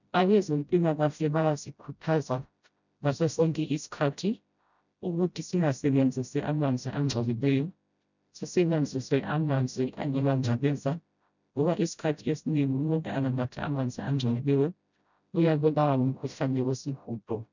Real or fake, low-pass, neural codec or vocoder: fake; 7.2 kHz; codec, 16 kHz, 0.5 kbps, FreqCodec, smaller model